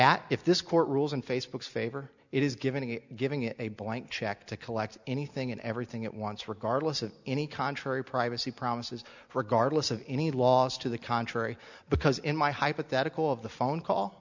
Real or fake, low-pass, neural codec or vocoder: real; 7.2 kHz; none